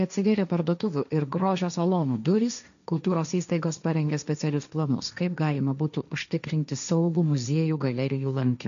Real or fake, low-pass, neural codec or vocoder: fake; 7.2 kHz; codec, 16 kHz, 1.1 kbps, Voila-Tokenizer